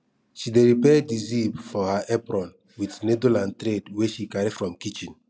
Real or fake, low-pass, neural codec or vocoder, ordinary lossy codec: real; none; none; none